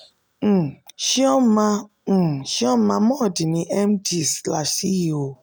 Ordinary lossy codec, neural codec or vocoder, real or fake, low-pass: none; none; real; none